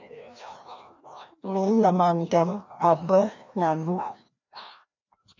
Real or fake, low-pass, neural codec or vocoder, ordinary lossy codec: fake; 7.2 kHz; codec, 16 kHz, 1 kbps, FreqCodec, larger model; MP3, 48 kbps